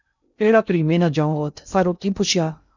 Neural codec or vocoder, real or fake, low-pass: codec, 16 kHz in and 24 kHz out, 0.6 kbps, FocalCodec, streaming, 2048 codes; fake; 7.2 kHz